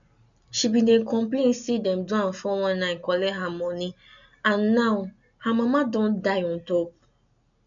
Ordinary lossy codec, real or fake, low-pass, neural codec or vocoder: none; real; 7.2 kHz; none